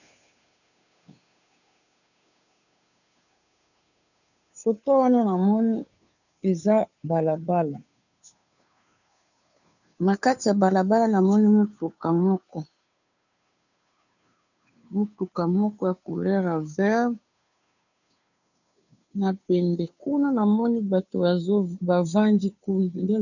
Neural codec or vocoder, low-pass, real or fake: codec, 16 kHz, 2 kbps, FunCodec, trained on Chinese and English, 25 frames a second; 7.2 kHz; fake